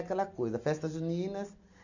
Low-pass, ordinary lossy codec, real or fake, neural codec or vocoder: 7.2 kHz; none; real; none